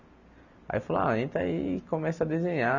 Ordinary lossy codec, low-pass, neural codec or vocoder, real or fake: none; 7.2 kHz; none; real